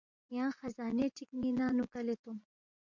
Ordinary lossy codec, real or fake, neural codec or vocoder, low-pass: MP3, 64 kbps; fake; vocoder, 24 kHz, 100 mel bands, Vocos; 7.2 kHz